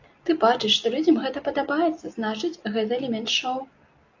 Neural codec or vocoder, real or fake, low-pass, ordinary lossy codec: none; real; 7.2 kHz; AAC, 48 kbps